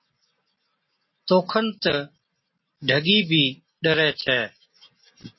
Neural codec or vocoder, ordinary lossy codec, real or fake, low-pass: none; MP3, 24 kbps; real; 7.2 kHz